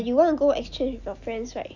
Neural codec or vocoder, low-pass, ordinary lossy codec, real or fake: none; 7.2 kHz; none; real